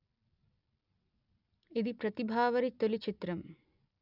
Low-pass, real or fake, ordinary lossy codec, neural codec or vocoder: 5.4 kHz; real; none; none